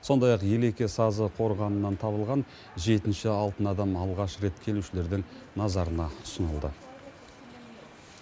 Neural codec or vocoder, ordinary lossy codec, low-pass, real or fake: none; none; none; real